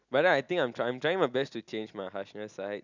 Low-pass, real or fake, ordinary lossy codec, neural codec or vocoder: 7.2 kHz; real; none; none